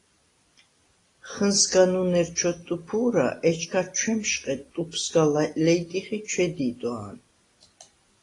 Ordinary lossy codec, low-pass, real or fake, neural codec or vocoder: AAC, 32 kbps; 10.8 kHz; real; none